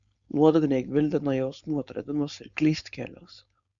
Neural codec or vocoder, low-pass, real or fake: codec, 16 kHz, 4.8 kbps, FACodec; 7.2 kHz; fake